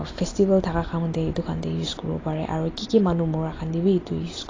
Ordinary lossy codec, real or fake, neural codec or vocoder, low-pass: AAC, 32 kbps; real; none; 7.2 kHz